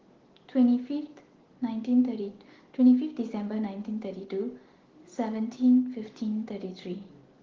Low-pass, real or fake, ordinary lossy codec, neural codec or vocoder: 7.2 kHz; real; Opus, 16 kbps; none